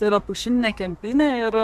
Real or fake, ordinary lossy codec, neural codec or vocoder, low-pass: fake; AAC, 96 kbps; codec, 32 kHz, 1.9 kbps, SNAC; 14.4 kHz